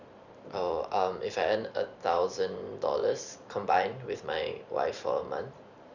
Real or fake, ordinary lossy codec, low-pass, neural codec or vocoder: fake; none; 7.2 kHz; vocoder, 44.1 kHz, 128 mel bands every 512 samples, BigVGAN v2